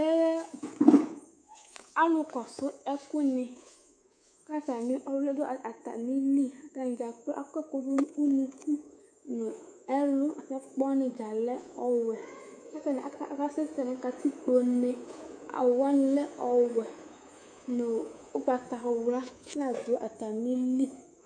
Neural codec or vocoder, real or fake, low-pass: codec, 24 kHz, 3.1 kbps, DualCodec; fake; 9.9 kHz